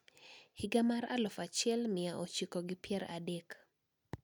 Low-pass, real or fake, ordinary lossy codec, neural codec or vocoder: 19.8 kHz; real; none; none